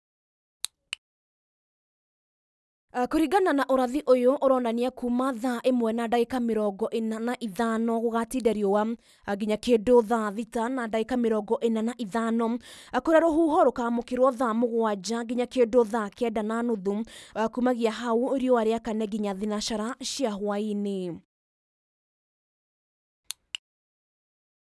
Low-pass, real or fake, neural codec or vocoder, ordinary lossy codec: none; real; none; none